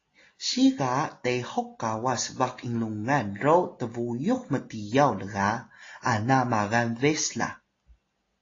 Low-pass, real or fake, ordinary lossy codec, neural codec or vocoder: 7.2 kHz; real; AAC, 32 kbps; none